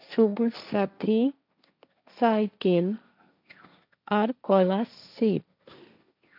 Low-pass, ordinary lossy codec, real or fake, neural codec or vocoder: 5.4 kHz; none; fake; codec, 16 kHz, 1.1 kbps, Voila-Tokenizer